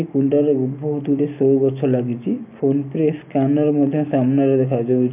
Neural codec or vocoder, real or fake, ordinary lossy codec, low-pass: none; real; AAC, 32 kbps; 3.6 kHz